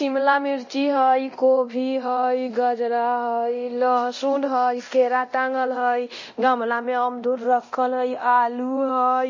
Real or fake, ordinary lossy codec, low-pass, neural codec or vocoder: fake; MP3, 32 kbps; 7.2 kHz; codec, 24 kHz, 0.9 kbps, DualCodec